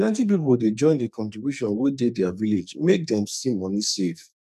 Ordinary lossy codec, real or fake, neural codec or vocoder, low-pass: none; fake; codec, 44.1 kHz, 2.6 kbps, SNAC; 14.4 kHz